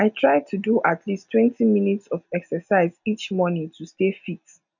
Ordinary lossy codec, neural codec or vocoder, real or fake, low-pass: none; none; real; 7.2 kHz